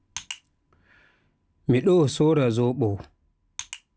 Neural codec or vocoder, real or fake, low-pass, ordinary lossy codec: none; real; none; none